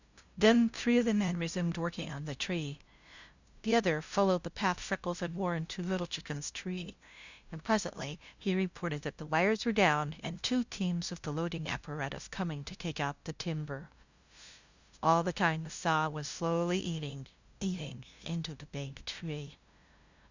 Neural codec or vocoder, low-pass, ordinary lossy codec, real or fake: codec, 16 kHz, 0.5 kbps, FunCodec, trained on LibriTTS, 25 frames a second; 7.2 kHz; Opus, 64 kbps; fake